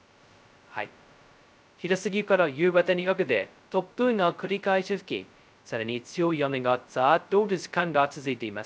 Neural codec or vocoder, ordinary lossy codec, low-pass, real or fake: codec, 16 kHz, 0.2 kbps, FocalCodec; none; none; fake